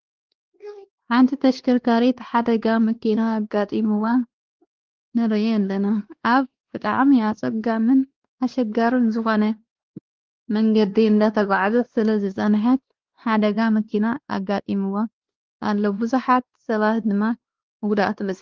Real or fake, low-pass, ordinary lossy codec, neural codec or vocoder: fake; 7.2 kHz; Opus, 16 kbps; codec, 16 kHz, 2 kbps, X-Codec, WavLM features, trained on Multilingual LibriSpeech